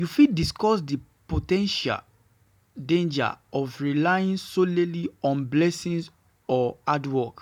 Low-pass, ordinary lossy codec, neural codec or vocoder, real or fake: 19.8 kHz; none; none; real